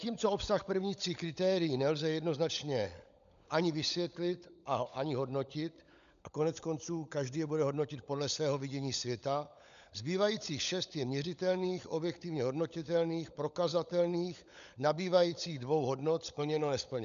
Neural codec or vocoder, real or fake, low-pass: codec, 16 kHz, 16 kbps, FunCodec, trained on LibriTTS, 50 frames a second; fake; 7.2 kHz